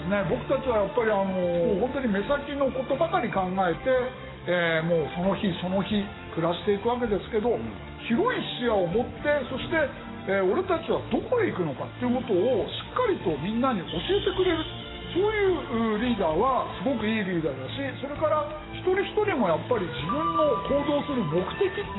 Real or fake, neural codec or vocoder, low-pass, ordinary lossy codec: fake; codec, 44.1 kHz, 7.8 kbps, DAC; 7.2 kHz; AAC, 16 kbps